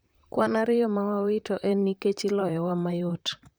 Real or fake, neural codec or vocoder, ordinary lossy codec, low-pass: fake; vocoder, 44.1 kHz, 128 mel bands, Pupu-Vocoder; none; none